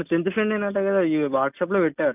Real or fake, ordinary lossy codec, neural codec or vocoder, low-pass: real; none; none; 3.6 kHz